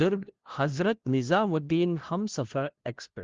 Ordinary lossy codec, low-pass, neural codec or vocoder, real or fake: Opus, 16 kbps; 7.2 kHz; codec, 16 kHz, 0.5 kbps, FunCodec, trained on LibriTTS, 25 frames a second; fake